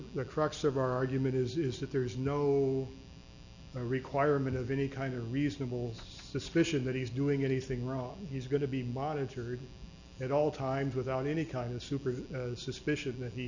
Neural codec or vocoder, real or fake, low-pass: none; real; 7.2 kHz